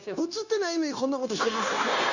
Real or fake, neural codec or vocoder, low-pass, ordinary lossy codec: fake; codec, 16 kHz, 0.9 kbps, LongCat-Audio-Codec; 7.2 kHz; none